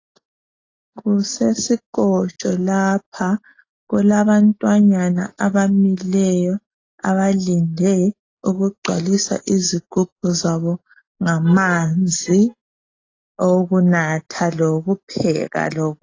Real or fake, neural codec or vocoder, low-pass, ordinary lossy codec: real; none; 7.2 kHz; AAC, 32 kbps